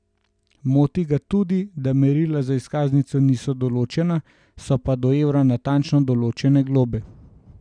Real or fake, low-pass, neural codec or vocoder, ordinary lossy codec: real; 9.9 kHz; none; none